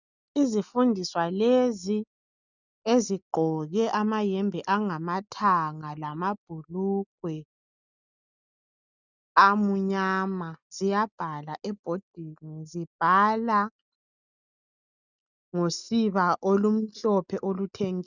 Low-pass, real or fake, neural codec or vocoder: 7.2 kHz; real; none